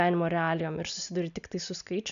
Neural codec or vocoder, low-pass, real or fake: none; 7.2 kHz; real